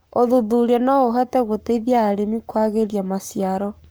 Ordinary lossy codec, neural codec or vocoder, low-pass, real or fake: none; codec, 44.1 kHz, 7.8 kbps, Pupu-Codec; none; fake